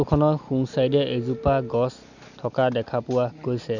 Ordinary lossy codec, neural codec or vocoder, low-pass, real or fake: none; none; 7.2 kHz; real